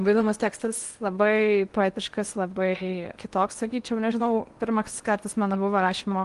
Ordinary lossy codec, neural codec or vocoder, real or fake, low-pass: Opus, 24 kbps; codec, 16 kHz in and 24 kHz out, 0.8 kbps, FocalCodec, streaming, 65536 codes; fake; 10.8 kHz